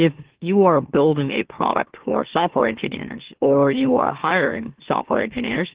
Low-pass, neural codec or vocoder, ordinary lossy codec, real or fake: 3.6 kHz; autoencoder, 44.1 kHz, a latent of 192 numbers a frame, MeloTTS; Opus, 16 kbps; fake